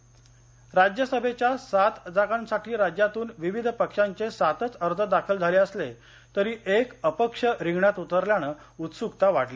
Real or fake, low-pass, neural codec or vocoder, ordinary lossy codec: real; none; none; none